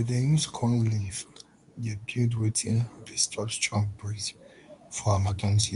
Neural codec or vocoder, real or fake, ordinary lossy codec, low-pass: codec, 24 kHz, 0.9 kbps, WavTokenizer, medium speech release version 2; fake; none; 10.8 kHz